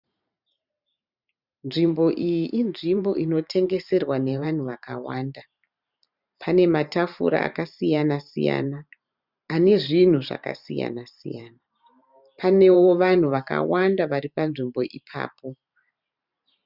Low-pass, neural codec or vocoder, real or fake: 5.4 kHz; vocoder, 44.1 kHz, 128 mel bands every 512 samples, BigVGAN v2; fake